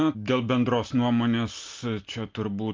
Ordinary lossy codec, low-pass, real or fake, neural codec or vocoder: Opus, 32 kbps; 7.2 kHz; real; none